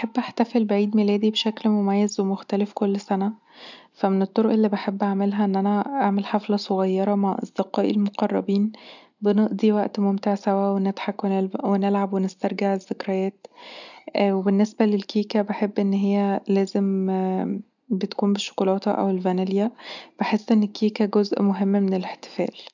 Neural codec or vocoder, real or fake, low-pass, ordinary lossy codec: none; real; 7.2 kHz; none